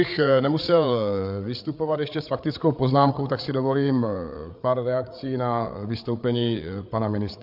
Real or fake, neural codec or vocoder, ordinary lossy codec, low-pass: fake; codec, 16 kHz, 8 kbps, FreqCodec, larger model; MP3, 48 kbps; 5.4 kHz